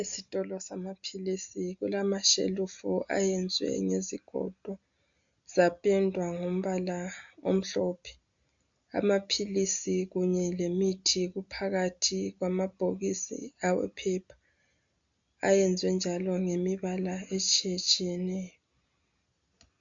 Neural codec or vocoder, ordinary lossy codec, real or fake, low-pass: none; MP3, 64 kbps; real; 7.2 kHz